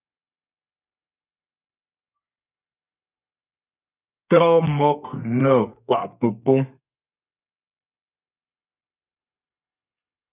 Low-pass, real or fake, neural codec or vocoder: 3.6 kHz; fake; codec, 32 kHz, 1.9 kbps, SNAC